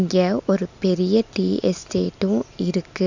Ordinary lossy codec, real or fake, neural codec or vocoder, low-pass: none; real; none; 7.2 kHz